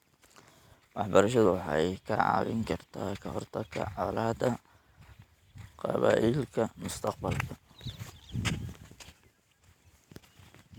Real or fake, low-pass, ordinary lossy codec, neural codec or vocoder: real; 19.8 kHz; none; none